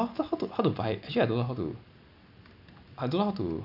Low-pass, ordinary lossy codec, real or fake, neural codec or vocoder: 5.4 kHz; none; real; none